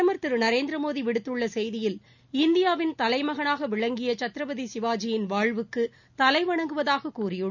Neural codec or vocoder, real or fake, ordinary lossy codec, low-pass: none; real; none; 7.2 kHz